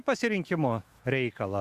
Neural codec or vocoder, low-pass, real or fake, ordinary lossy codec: autoencoder, 48 kHz, 128 numbers a frame, DAC-VAE, trained on Japanese speech; 14.4 kHz; fake; Opus, 64 kbps